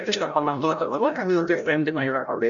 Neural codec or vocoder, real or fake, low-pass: codec, 16 kHz, 0.5 kbps, FreqCodec, larger model; fake; 7.2 kHz